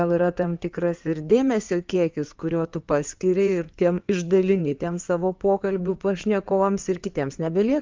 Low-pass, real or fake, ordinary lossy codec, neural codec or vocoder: 7.2 kHz; fake; Opus, 24 kbps; codec, 16 kHz in and 24 kHz out, 2.2 kbps, FireRedTTS-2 codec